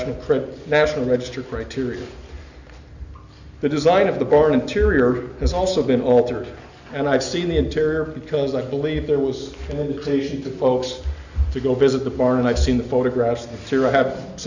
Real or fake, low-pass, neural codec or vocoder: real; 7.2 kHz; none